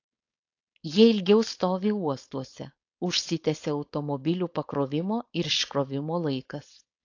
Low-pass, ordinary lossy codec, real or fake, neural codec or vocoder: 7.2 kHz; AAC, 48 kbps; fake; codec, 16 kHz, 4.8 kbps, FACodec